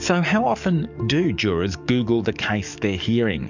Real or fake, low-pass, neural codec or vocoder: fake; 7.2 kHz; codec, 44.1 kHz, 7.8 kbps, DAC